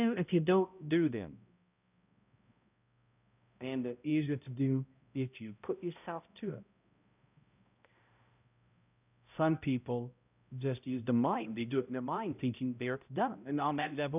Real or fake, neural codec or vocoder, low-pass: fake; codec, 16 kHz, 0.5 kbps, X-Codec, HuBERT features, trained on balanced general audio; 3.6 kHz